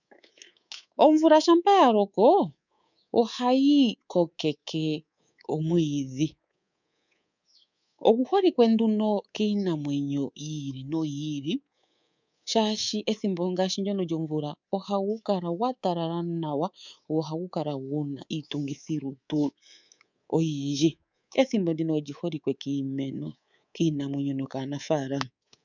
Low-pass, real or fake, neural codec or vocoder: 7.2 kHz; fake; codec, 24 kHz, 3.1 kbps, DualCodec